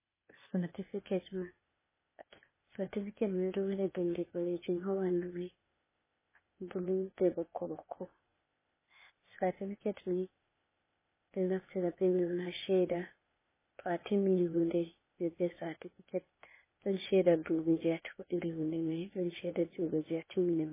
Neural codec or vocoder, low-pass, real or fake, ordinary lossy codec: codec, 16 kHz, 0.8 kbps, ZipCodec; 3.6 kHz; fake; MP3, 16 kbps